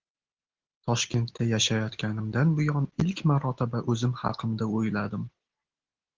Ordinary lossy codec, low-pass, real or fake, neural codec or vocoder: Opus, 16 kbps; 7.2 kHz; real; none